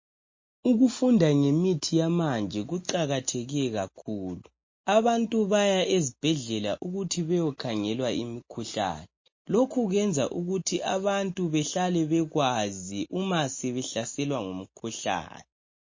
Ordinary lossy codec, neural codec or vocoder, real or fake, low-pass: MP3, 32 kbps; none; real; 7.2 kHz